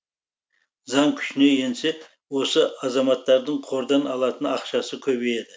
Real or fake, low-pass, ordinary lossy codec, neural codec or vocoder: real; none; none; none